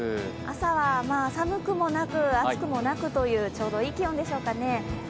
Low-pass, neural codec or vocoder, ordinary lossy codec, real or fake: none; none; none; real